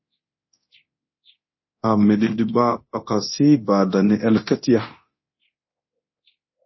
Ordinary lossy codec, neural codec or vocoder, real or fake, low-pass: MP3, 24 kbps; codec, 24 kHz, 0.9 kbps, DualCodec; fake; 7.2 kHz